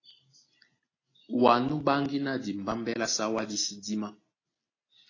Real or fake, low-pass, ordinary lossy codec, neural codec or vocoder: real; 7.2 kHz; AAC, 32 kbps; none